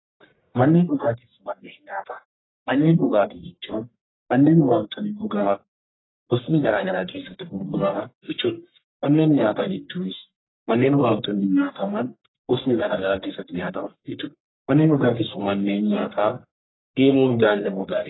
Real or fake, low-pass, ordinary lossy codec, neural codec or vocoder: fake; 7.2 kHz; AAC, 16 kbps; codec, 44.1 kHz, 1.7 kbps, Pupu-Codec